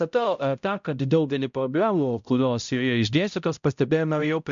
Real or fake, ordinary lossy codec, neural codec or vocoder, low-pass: fake; MP3, 64 kbps; codec, 16 kHz, 0.5 kbps, X-Codec, HuBERT features, trained on balanced general audio; 7.2 kHz